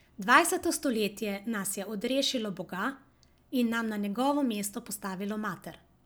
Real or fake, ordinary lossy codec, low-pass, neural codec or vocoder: real; none; none; none